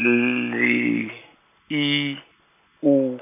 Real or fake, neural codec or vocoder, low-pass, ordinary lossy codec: real; none; 3.6 kHz; none